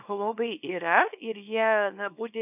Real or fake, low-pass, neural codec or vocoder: fake; 3.6 kHz; codec, 24 kHz, 0.9 kbps, WavTokenizer, small release